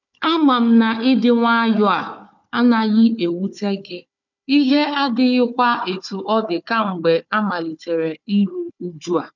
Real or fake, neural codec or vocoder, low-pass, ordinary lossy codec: fake; codec, 16 kHz, 4 kbps, FunCodec, trained on Chinese and English, 50 frames a second; 7.2 kHz; none